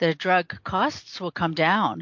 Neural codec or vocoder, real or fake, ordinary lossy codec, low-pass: none; real; MP3, 48 kbps; 7.2 kHz